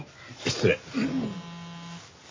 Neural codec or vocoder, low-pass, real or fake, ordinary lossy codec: none; 7.2 kHz; real; MP3, 32 kbps